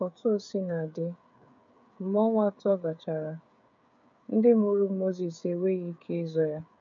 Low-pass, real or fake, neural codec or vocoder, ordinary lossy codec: 7.2 kHz; fake; codec, 16 kHz, 8 kbps, FreqCodec, smaller model; MP3, 64 kbps